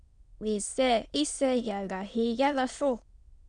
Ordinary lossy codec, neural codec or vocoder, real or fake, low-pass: Opus, 64 kbps; autoencoder, 22.05 kHz, a latent of 192 numbers a frame, VITS, trained on many speakers; fake; 9.9 kHz